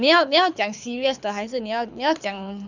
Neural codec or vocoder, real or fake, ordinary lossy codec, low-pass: codec, 24 kHz, 6 kbps, HILCodec; fake; none; 7.2 kHz